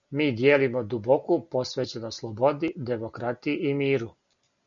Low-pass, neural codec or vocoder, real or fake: 7.2 kHz; none; real